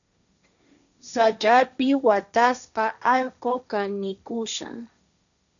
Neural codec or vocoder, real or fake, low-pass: codec, 16 kHz, 1.1 kbps, Voila-Tokenizer; fake; 7.2 kHz